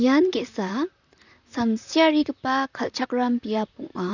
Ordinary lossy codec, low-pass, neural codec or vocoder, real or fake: none; 7.2 kHz; vocoder, 44.1 kHz, 128 mel bands, Pupu-Vocoder; fake